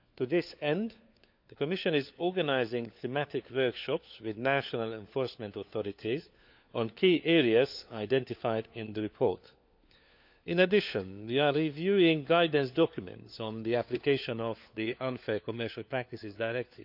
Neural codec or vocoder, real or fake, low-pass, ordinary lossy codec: codec, 16 kHz, 4 kbps, FunCodec, trained on LibriTTS, 50 frames a second; fake; 5.4 kHz; none